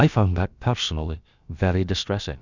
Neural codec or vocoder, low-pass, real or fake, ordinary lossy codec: codec, 16 kHz, about 1 kbps, DyCAST, with the encoder's durations; 7.2 kHz; fake; Opus, 64 kbps